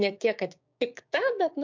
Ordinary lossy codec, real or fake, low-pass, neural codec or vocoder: MP3, 64 kbps; fake; 7.2 kHz; codec, 16 kHz, 6 kbps, DAC